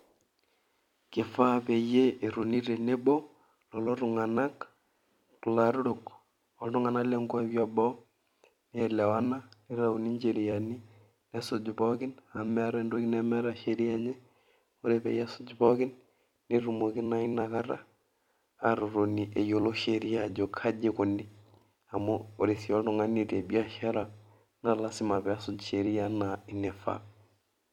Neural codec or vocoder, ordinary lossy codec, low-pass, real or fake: vocoder, 44.1 kHz, 128 mel bands every 256 samples, BigVGAN v2; none; 19.8 kHz; fake